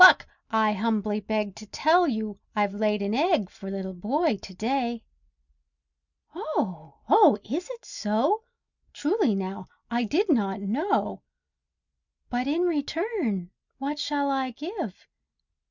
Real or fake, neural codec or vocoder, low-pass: real; none; 7.2 kHz